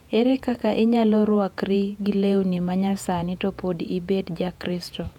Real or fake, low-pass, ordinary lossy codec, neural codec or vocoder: fake; 19.8 kHz; none; vocoder, 48 kHz, 128 mel bands, Vocos